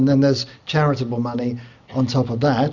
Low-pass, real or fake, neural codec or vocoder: 7.2 kHz; real; none